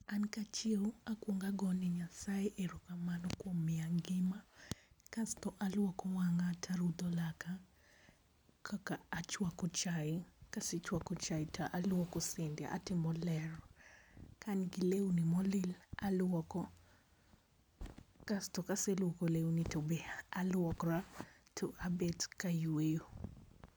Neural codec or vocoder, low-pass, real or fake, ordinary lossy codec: none; none; real; none